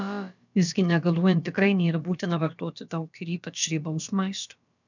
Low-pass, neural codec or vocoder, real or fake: 7.2 kHz; codec, 16 kHz, about 1 kbps, DyCAST, with the encoder's durations; fake